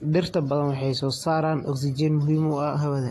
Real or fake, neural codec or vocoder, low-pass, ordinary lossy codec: real; none; 14.4 kHz; AAC, 48 kbps